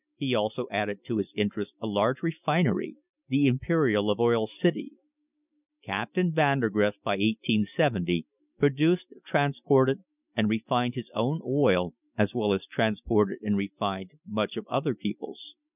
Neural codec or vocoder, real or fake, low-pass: none; real; 3.6 kHz